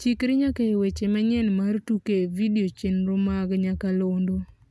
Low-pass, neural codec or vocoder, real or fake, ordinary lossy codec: none; none; real; none